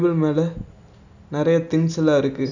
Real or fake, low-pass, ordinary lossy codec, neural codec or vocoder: real; 7.2 kHz; none; none